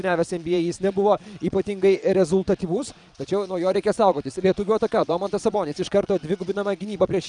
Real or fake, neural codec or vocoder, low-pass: fake; vocoder, 22.05 kHz, 80 mel bands, Vocos; 9.9 kHz